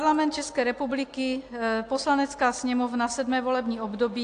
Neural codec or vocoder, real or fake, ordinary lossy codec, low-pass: none; real; AAC, 48 kbps; 9.9 kHz